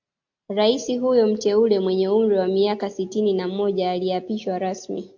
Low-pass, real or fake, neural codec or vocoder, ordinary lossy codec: 7.2 kHz; real; none; AAC, 48 kbps